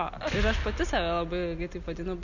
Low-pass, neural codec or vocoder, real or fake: 7.2 kHz; none; real